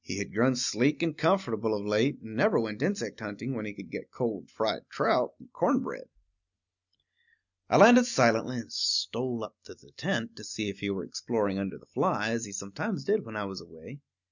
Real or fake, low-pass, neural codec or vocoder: real; 7.2 kHz; none